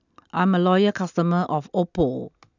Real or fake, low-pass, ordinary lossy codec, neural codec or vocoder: real; 7.2 kHz; none; none